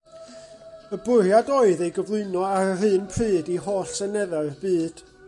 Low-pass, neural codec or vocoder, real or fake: 10.8 kHz; none; real